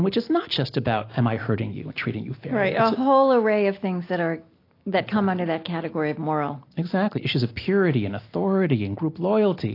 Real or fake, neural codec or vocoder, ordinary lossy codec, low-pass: real; none; AAC, 32 kbps; 5.4 kHz